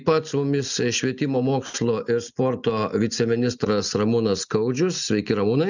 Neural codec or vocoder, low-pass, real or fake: none; 7.2 kHz; real